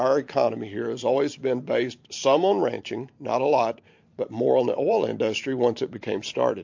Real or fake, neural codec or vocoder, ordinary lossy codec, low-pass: fake; vocoder, 44.1 kHz, 128 mel bands every 512 samples, BigVGAN v2; MP3, 48 kbps; 7.2 kHz